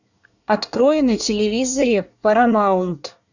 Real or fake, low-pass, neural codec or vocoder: fake; 7.2 kHz; codec, 24 kHz, 1 kbps, SNAC